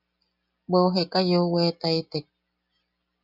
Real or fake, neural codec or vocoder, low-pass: real; none; 5.4 kHz